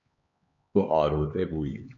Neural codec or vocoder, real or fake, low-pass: codec, 16 kHz, 4 kbps, X-Codec, HuBERT features, trained on LibriSpeech; fake; 7.2 kHz